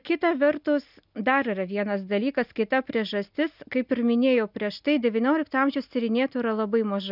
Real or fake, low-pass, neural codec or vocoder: real; 5.4 kHz; none